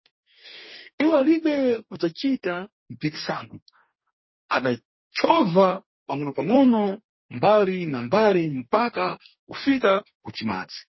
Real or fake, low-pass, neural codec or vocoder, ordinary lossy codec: fake; 7.2 kHz; codec, 44.1 kHz, 2.6 kbps, DAC; MP3, 24 kbps